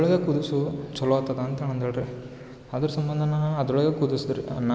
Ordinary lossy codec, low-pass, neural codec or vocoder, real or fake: none; none; none; real